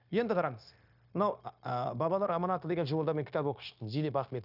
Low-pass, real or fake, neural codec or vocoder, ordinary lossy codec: 5.4 kHz; fake; codec, 16 kHz, 0.9 kbps, LongCat-Audio-Codec; none